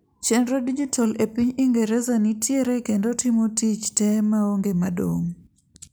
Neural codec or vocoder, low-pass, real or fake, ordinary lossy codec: none; none; real; none